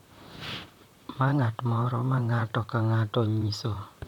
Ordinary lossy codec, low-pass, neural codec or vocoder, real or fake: none; 19.8 kHz; vocoder, 44.1 kHz, 128 mel bands, Pupu-Vocoder; fake